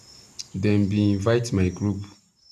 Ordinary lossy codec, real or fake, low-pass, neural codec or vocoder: none; real; 14.4 kHz; none